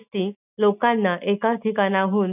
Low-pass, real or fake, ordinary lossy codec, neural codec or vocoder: 3.6 kHz; real; none; none